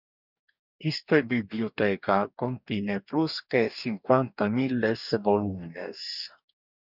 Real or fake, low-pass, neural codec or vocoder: fake; 5.4 kHz; codec, 44.1 kHz, 2.6 kbps, DAC